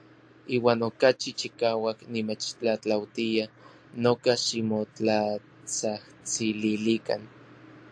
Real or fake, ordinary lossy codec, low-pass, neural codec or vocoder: real; AAC, 64 kbps; 9.9 kHz; none